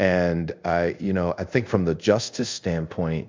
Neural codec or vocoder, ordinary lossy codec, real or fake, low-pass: codec, 24 kHz, 0.5 kbps, DualCodec; MP3, 64 kbps; fake; 7.2 kHz